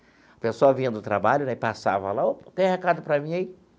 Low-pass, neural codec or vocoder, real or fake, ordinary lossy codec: none; none; real; none